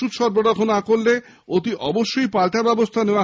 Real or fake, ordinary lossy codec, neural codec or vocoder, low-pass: real; none; none; none